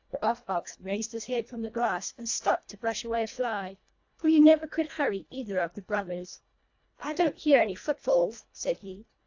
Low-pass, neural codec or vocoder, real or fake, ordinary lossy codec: 7.2 kHz; codec, 24 kHz, 1.5 kbps, HILCodec; fake; AAC, 48 kbps